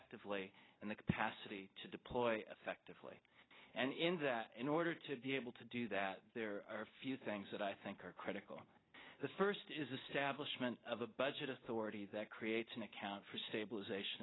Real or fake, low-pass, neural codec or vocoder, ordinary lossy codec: real; 7.2 kHz; none; AAC, 16 kbps